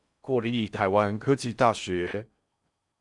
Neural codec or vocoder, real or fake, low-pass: codec, 16 kHz in and 24 kHz out, 0.6 kbps, FocalCodec, streaming, 4096 codes; fake; 10.8 kHz